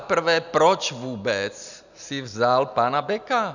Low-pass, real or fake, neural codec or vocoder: 7.2 kHz; real; none